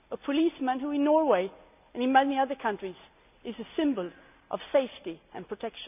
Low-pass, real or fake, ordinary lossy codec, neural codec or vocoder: 3.6 kHz; real; none; none